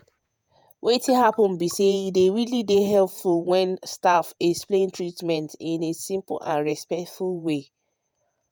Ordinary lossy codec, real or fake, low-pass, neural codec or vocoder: none; fake; none; vocoder, 48 kHz, 128 mel bands, Vocos